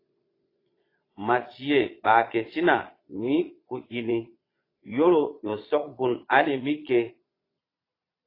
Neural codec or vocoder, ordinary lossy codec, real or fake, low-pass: vocoder, 22.05 kHz, 80 mel bands, Vocos; AAC, 24 kbps; fake; 5.4 kHz